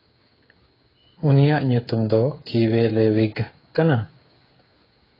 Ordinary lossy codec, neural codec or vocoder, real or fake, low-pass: AAC, 24 kbps; codec, 16 kHz, 8 kbps, FreqCodec, smaller model; fake; 5.4 kHz